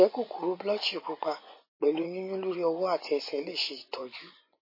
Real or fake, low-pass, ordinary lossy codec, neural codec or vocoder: fake; 5.4 kHz; MP3, 24 kbps; vocoder, 24 kHz, 100 mel bands, Vocos